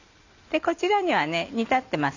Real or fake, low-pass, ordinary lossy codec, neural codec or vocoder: real; 7.2 kHz; none; none